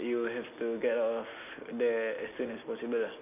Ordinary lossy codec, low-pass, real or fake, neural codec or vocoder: none; 3.6 kHz; real; none